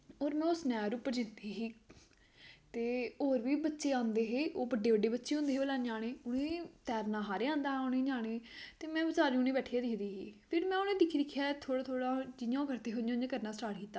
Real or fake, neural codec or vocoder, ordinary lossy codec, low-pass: real; none; none; none